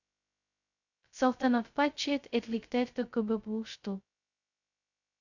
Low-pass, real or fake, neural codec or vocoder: 7.2 kHz; fake; codec, 16 kHz, 0.2 kbps, FocalCodec